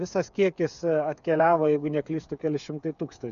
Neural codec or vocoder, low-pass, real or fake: codec, 16 kHz, 8 kbps, FreqCodec, smaller model; 7.2 kHz; fake